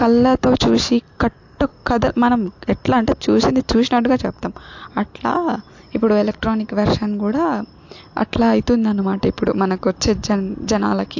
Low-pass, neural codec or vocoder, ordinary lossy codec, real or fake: 7.2 kHz; none; AAC, 48 kbps; real